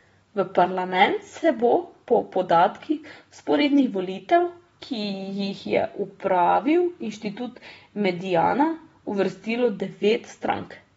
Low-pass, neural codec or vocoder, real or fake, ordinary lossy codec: 19.8 kHz; none; real; AAC, 24 kbps